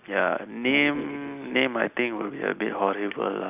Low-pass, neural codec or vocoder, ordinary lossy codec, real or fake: 3.6 kHz; none; none; real